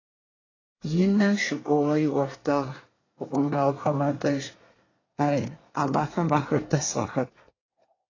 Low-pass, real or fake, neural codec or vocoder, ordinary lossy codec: 7.2 kHz; fake; codec, 24 kHz, 1 kbps, SNAC; AAC, 32 kbps